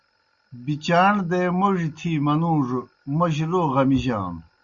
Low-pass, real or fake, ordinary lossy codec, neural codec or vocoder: 7.2 kHz; real; Opus, 64 kbps; none